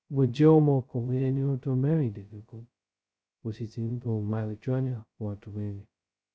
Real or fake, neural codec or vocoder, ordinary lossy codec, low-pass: fake; codec, 16 kHz, 0.2 kbps, FocalCodec; none; none